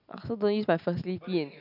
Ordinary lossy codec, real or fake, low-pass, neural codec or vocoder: none; real; 5.4 kHz; none